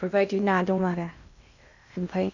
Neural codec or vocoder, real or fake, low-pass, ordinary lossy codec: codec, 16 kHz in and 24 kHz out, 0.6 kbps, FocalCodec, streaming, 2048 codes; fake; 7.2 kHz; none